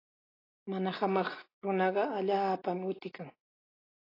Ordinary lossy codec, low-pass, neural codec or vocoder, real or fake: AAC, 24 kbps; 5.4 kHz; none; real